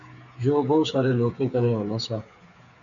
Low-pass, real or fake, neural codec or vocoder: 7.2 kHz; fake; codec, 16 kHz, 8 kbps, FreqCodec, smaller model